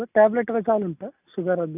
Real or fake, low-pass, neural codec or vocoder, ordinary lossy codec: real; 3.6 kHz; none; none